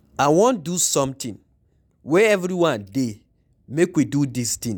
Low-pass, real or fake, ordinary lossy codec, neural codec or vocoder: 19.8 kHz; real; none; none